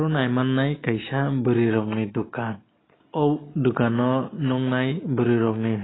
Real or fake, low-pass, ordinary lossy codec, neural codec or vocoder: real; 7.2 kHz; AAC, 16 kbps; none